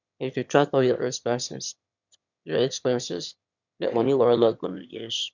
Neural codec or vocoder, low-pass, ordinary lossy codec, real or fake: autoencoder, 22.05 kHz, a latent of 192 numbers a frame, VITS, trained on one speaker; 7.2 kHz; none; fake